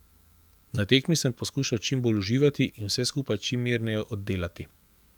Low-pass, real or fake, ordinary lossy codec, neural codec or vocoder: 19.8 kHz; fake; none; codec, 44.1 kHz, 7.8 kbps, DAC